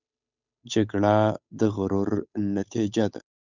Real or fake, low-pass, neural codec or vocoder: fake; 7.2 kHz; codec, 16 kHz, 8 kbps, FunCodec, trained on Chinese and English, 25 frames a second